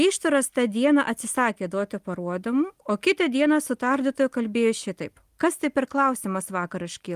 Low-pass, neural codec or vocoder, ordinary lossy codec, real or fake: 14.4 kHz; none; Opus, 24 kbps; real